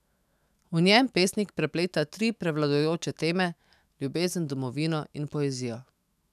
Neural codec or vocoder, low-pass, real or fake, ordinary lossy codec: autoencoder, 48 kHz, 128 numbers a frame, DAC-VAE, trained on Japanese speech; 14.4 kHz; fake; none